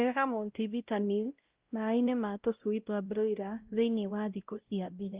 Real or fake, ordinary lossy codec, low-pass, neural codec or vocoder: fake; Opus, 32 kbps; 3.6 kHz; codec, 16 kHz, 0.5 kbps, X-Codec, WavLM features, trained on Multilingual LibriSpeech